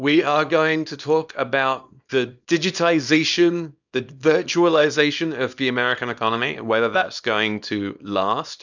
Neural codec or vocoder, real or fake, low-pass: codec, 24 kHz, 0.9 kbps, WavTokenizer, small release; fake; 7.2 kHz